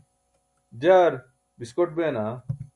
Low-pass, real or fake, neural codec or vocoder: 10.8 kHz; real; none